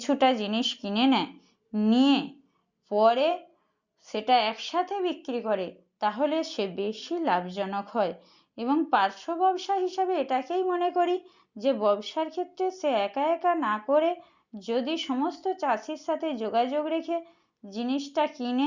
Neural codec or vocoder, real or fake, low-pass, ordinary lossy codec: none; real; 7.2 kHz; Opus, 64 kbps